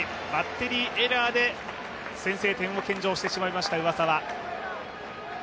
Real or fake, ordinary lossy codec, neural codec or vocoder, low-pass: real; none; none; none